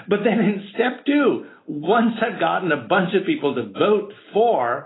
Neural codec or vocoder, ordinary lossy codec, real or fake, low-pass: none; AAC, 16 kbps; real; 7.2 kHz